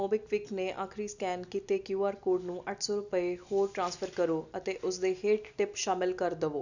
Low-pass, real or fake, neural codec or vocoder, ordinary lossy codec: 7.2 kHz; real; none; none